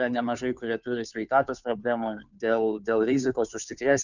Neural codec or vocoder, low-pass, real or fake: codec, 16 kHz, 2 kbps, FunCodec, trained on Chinese and English, 25 frames a second; 7.2 kHz; fake